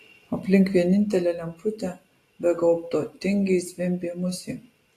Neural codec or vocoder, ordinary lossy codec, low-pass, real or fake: none; AAC, 48 kbps; 14.4 kHz; real